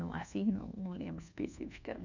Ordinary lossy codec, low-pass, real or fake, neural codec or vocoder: none; 7.2 kHz; fake; codec, 24 kHz, 1.2 kbps, DualCodec